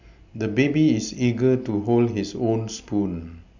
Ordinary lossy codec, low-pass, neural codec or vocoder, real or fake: none; 7.2 kHz; none; real